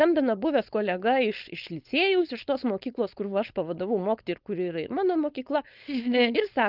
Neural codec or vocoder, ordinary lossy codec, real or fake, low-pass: codec, 16 kHz, 4.8 kbps, FACodec; Opus, 32 kbps; fake; 5.4 kHz